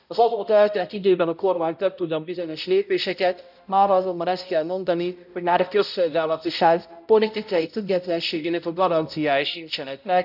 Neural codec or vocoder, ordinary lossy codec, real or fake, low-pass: codec, 16 kHz, 0.5 kbps, X-Codec, HuBERT features, trained on balanced general audio; none; fake; 5.4 kHz